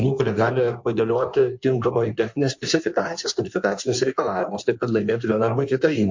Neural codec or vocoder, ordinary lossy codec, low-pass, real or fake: codec, 16 kHz in and 24 kHz out, 1.1 kbps, FireRedTTS-2 codec; MP3, 48 kbps; 7.2 kHz; fake